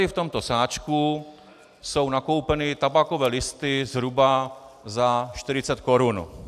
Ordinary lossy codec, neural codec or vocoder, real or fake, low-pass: AAC, 96 kbps; none; real; 14.4 kHz